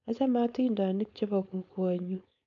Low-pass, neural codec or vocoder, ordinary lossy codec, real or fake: 7.2 kHz; codec, 16 kHz, 4.8 kbps, FACodec; none; fake